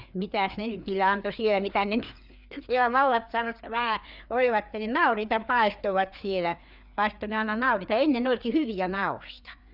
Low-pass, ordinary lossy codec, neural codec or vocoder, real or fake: 5.4 kHz; none; codec, 16 kHz, 4 kbps, FreqCodec, larger model; fake